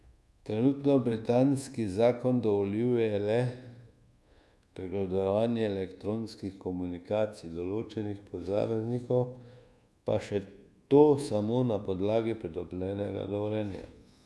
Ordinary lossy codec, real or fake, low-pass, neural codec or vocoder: none; fake; none; codec, 24 kHz, 1.2 kbps, DualCodec